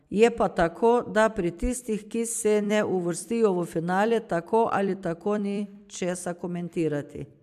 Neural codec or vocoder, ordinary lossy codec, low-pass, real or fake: vocoder, 44.1 kHz, 128 mel bands every 256 samples, BigVGAN v2; AAC, 96 kbps; 14.4 kHz; fake